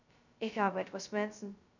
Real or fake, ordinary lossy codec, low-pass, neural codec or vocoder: fake; none; 7.2 kHz; codec, 16 kHz, 0.2 kbps, FocalCodec